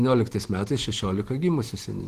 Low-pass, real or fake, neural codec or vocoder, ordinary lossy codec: 14.4 kHz; fake; vocoder, 44.1 kHz, 128 mel bands every 512 samples, BigVGAN v2; Opus, 16 kbps